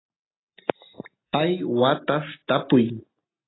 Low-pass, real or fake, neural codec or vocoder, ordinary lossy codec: 7.2 kHz; real; none; AAC, 16 kbps